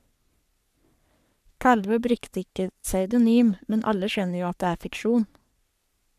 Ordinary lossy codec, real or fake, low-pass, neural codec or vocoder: none; fake; 14.4 kHz; codec, 44.1 kHz, 3.4 kbps, Pupu-Codec